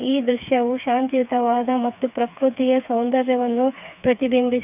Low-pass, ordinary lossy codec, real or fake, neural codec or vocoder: 3.6 kHz; none; fake; codec, 16 kHz, 4 kbps, FreqCodec, smaller model